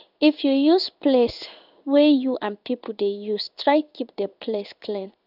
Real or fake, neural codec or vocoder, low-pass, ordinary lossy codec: fake; codec, 16 kHz in and 24 kHz out, 1 kbps, XY-Tokenizer; 5.4 kHz; none